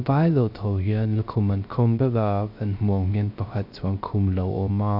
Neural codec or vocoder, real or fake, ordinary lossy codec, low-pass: codec, 16 kHz, 0.3 kbps, FocalCodec; fake; none; 5.4 kHz